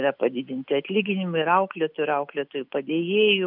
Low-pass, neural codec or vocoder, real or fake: 5.4 kHz; none; real